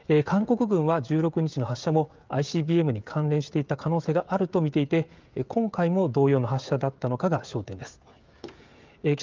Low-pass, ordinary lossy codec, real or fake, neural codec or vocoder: 7.2 kHz; Opus, 24 kbps; fake; codec, 16 kHz, 16 kbps, FreqCodec, smaller model